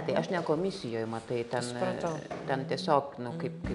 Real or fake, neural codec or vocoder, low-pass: real; none; 10.8 kHz